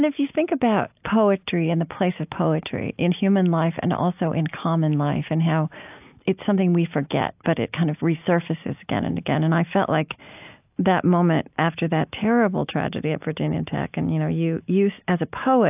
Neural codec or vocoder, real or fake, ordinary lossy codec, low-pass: none; real; AAC, 32 kbps; 3.6 kHz